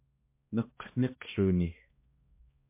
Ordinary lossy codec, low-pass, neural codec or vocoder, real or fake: MP3, 24 kbps; 3.6 kHz; codec, 16 kHz, 1 kbps, X-Codec, HuBERT features, trained on balanced general audio; fake